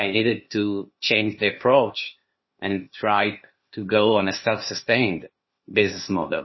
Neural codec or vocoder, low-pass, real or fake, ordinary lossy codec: codec, 16 kHz, 0.8 kbps, ZipCodec; 7.2 kHz; fake; MP3, 24 kbps